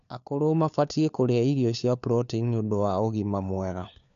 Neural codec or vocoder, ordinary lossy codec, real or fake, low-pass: codec, 16 kHz, 4 kbps, FunCodec, trained on LibriTTS, 50 frames a second; none; fake; 7.2 kHz